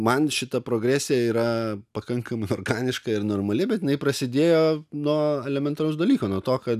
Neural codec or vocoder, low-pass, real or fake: none; 14.4 kHz; real